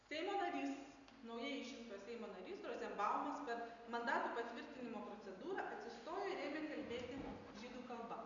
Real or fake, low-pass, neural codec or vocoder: real; 7.2 kHz; none